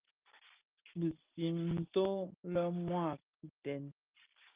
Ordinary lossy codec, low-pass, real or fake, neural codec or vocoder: Opus, 24 kbps; 3.6 kHz; real; none